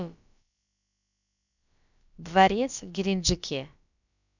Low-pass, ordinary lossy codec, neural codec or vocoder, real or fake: 7.2 kHz; none; codec, 16 kHz, about 1 kbps, DyCAST, with the encoder's durations; fake